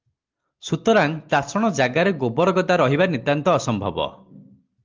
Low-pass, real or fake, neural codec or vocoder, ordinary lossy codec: 7.2 kHz; real; none; Opus, 32 kbps